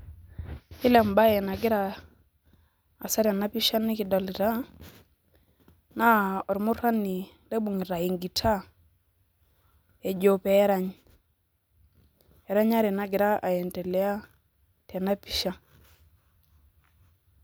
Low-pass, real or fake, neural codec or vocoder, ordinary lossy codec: none; real; none; none